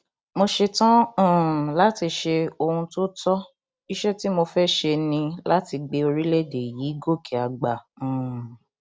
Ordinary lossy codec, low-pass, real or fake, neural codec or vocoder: none; none; real; none